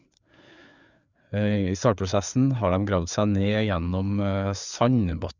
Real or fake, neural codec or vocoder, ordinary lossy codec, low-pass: fake; codec, 16 kHz, 4 kbps, FreqCodec, larger model; none; 7.2 kHz